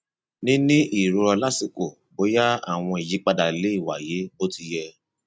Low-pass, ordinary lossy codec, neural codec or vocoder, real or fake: none; none; none; real